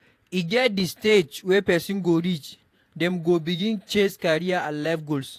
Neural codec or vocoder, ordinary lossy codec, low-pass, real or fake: vocoder, 48 kHz, 128 mel bands, Vocos; AAC, 64 kbps; 14.4 kHz; fake